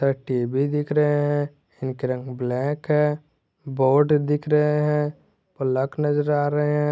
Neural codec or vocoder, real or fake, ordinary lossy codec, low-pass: none; real; none; none